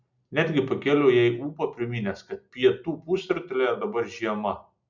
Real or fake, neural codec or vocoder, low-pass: real; none; 7.2 kHz